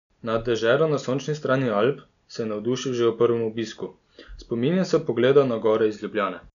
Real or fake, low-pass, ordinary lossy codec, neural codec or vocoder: real; 7.2 kHz; none; none